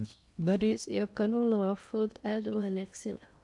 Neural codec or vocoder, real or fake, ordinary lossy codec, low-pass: codec, 16 kHz in and 24 kHz out, 0.6 kbps, FocalCodec, streaming, 2048 codes; fake; none; 10.8 kHz